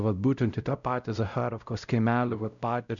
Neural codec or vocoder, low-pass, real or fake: codec, 16 kHz, 0.5 kbps, X-Codec, WavLM features, trained on Multilingual LibriSpeech; 7.2 kHz; fake